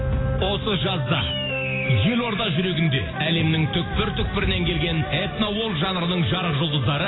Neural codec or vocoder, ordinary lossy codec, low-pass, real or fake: none; AAC, 16 kbps; 7.2 kHz; real